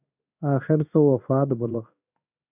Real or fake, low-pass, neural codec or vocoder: fake; 3.6 kHz; codec, 16 kHz in and 24 kHz out, 1 kbps, XY-Tokenizer